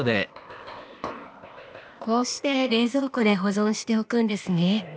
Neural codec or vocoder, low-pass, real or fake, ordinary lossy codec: codec, 16 kHz, 0.8 kbps, ZipCodec; none; fake; none